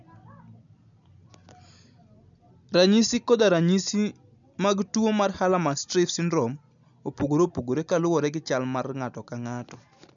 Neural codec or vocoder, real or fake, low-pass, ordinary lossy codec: none; real; 7.2 kHz; none